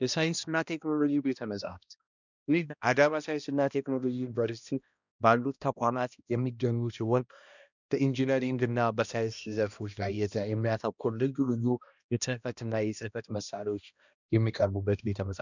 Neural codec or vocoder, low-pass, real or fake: codec, 16 kHz, 1 kbps, X-Codec, HuBERT features, trained on balanced general audio; 7.2 kHz; fake